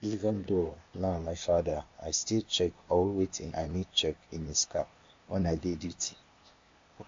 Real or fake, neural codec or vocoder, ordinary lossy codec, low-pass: fake; codec, 16 kHz, 0.8 kbps, ZipCodec; MP3, 48 kbps; 7.2 kHz